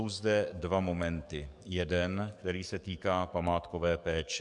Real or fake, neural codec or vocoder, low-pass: fake; codec, 44.1 kHz, 7.8 kbps, DAC; 10.8 kHz